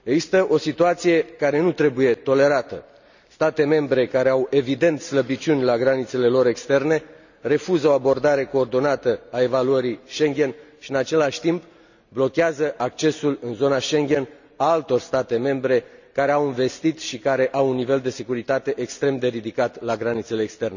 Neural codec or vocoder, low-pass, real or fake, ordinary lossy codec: none; 7.2 kHz; real; none